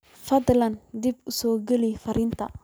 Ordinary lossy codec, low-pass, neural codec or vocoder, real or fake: none; none; none; real